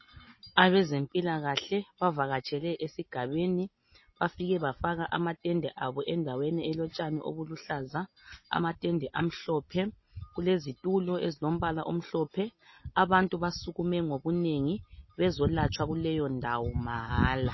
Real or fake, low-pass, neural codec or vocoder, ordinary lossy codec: real; 7.2 kHz; none; MP3, 24 kbps